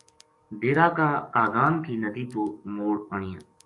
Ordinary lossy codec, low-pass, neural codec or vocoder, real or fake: AAC, 64 kbps; 10.8 kHz; codec, 44.1 kHz, 7.8 kbps, DAC; fake